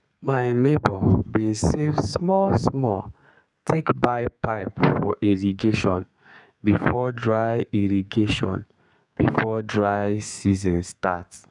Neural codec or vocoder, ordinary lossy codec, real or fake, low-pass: codec, 32 kHz, 1.9 kbps, SNAC; none; fake; 10.8 kHz